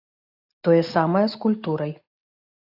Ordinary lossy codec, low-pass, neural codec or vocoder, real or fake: AAC, 48 kbps; 5.4 kHz; none; real